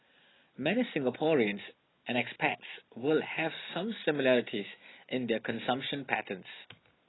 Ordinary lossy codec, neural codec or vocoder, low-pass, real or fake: AAC, 16 kbps; none; 7.2 kHz; real